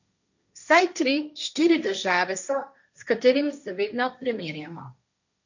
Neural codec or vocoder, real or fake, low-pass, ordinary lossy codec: codec, 16 kHz, 1.1 kbps, Voila-Tokenizer; fake; 7.2 kHz; none